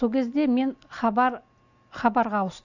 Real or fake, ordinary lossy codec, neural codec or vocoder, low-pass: real; none; none; 7.2 kHz